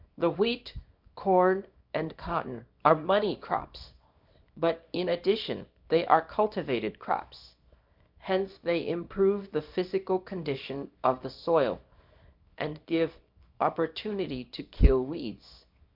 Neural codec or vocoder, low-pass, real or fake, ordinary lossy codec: codec, 24 kHz, 0.9 kbps, WavTokenizer, small release; 5.4 kHz; fake; MP3, 48 kbps